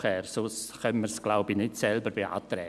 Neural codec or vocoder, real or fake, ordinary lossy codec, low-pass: none; real; none; none